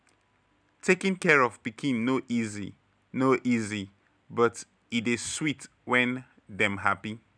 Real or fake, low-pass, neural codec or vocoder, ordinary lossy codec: real; 9.9 kHz; none; none